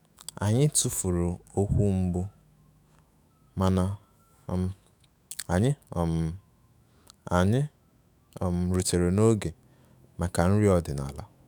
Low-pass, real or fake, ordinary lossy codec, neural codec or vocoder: none; fake; none; autoencoder, 48 kHz, 128 numbers a frame, DAC-VAE, trained on Japanese speech